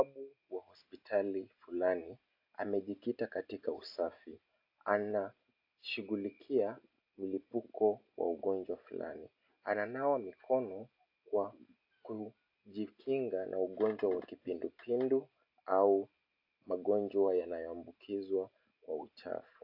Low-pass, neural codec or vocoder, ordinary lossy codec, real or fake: 5.4 kHz; none; AAC, 48 kbps; real